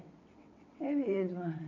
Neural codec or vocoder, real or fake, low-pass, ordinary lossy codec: vocoder, 22.05 kHz, 80 mel bands, WaveNeXt; fake; 7.2 kHz; none